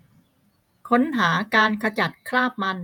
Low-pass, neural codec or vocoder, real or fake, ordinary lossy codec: 19.8 kHz; vocoder, 48 kHz, 128 mel bands, Vocos; fake; none